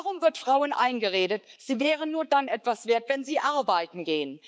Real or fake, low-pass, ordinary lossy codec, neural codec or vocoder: fake; none; none; codec, 16 kHz, 4 kbps, X-Codec, HuBERT features, trained on balanced general audio